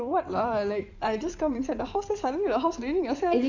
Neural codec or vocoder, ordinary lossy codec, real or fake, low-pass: codec, 16 kHz in and 24 kHz out, 2.2 kbps, FireRedTTS-2 codec; none; fake; 7.2 kHz